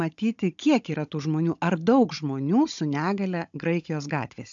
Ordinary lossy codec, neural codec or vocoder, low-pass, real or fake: AAC, 64 kbps; codec, 16 kHz, 16 kbps, FunCodec, trained on Chinese and English, 50 frames a second; 7.2 kHz; fake